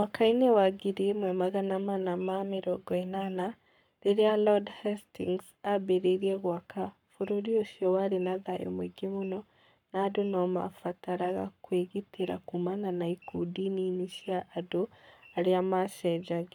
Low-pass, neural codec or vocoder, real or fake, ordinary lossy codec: 19.8 kHz; codec, 44.1 kHz, 7.8 kbps, Pupu-Codec; fake; none